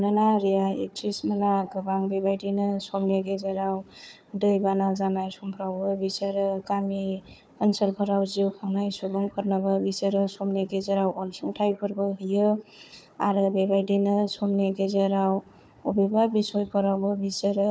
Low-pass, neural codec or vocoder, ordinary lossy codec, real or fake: none; codec, 16 kHz, 4 kbps, FunCodec, trained on Chinese and English, 50 frames a second; none; fake